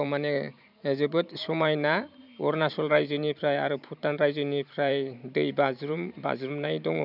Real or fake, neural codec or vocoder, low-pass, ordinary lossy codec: fake; vocoder, 44.1 kHz, 128 mel bands every 512 samples, BigVGAN v2; 5.4 kHz; none